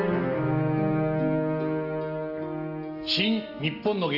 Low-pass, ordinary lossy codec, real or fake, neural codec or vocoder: 5.4 kHz; Opus, 32 kbps; real; none